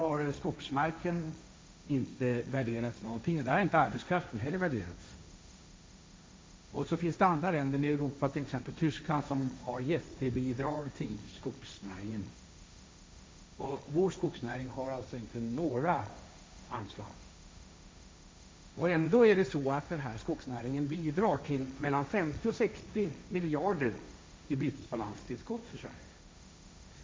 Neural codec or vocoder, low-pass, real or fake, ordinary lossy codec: codec, 16 kHz, 1.1 kbps, Voila-Tokenizer; none; fake; none